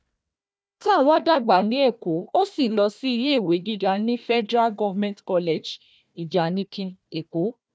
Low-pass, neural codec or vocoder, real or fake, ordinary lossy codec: none; codec, 16 kHz, 1 kbps, FunCodec, trained on Chinese and English, 50 frames a second; fake; none